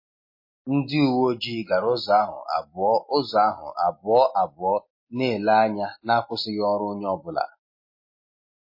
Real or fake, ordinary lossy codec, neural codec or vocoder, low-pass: real; MP3, 24 kbps; none; 5.4 kHz